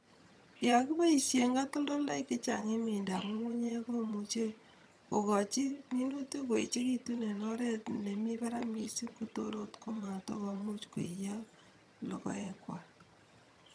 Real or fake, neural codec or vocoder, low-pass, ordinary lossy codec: fake; vocoder, 22.05 kHz, 80 mel bands, HiFi-GAN; none; none